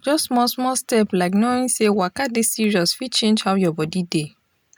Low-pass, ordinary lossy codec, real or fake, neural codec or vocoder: none; none; real; none